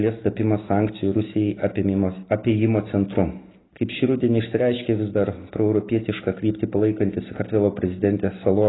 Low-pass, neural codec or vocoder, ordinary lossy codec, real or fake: 7.2 kHz; none; AAC, 16 kbps; real